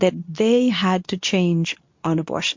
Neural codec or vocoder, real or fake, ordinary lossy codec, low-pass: codec, 24 kHz, 0.9 kbps, WavTokenizer, medium speech release version 2; fake; MP3, 48 kbps; 7.2 kHz